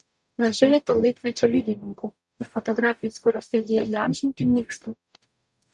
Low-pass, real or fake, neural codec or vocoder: 10.8 kHz; fake; codec, 44.1 kHz, 0.9 kbps, DAC